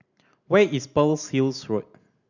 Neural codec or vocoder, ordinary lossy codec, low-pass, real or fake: none; none; 7.2 kHz; real